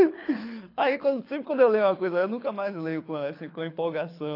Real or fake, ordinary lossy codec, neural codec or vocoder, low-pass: fake; AAC, 32 kbps; codec, 24 kHz, 6 kbps, HILCodec; 5.4 kHz